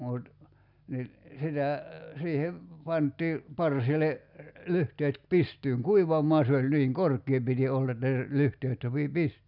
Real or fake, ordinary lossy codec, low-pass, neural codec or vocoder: real; none; 5.4 kHz; none